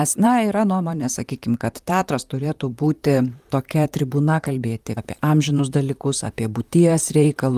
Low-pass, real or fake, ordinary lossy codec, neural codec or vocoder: 14.4 kHz; fake; Opus, 32 kbps; vocoder, 44.1 kHz, 128 mel bands, Pupu-Vocoder